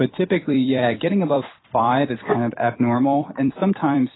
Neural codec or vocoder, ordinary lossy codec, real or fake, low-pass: vocoder, 44.1 kHz, 128 mel bands every 256 samples, BigVGAN v2; AAC, 16 kbps; fake; 7.2 kHz